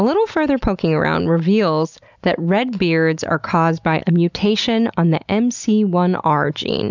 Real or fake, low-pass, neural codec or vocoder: real; 7.2 kHz; none